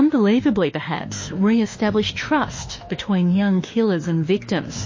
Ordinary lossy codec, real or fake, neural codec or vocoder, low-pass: MP3, 32 kbps; fake; autoencoder, 48 kHz, 32 numbers a frame, DAC-VAE, trained on Japanese speech; 7.2 kHz